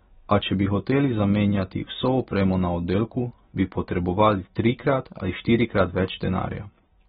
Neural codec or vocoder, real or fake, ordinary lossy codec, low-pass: none; real; AAC, 16 kbps; 19.8 kHz